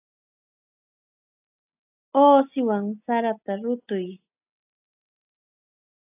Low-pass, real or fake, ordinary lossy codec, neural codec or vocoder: 3.6 kHz; real; AAC, 32 kbps; none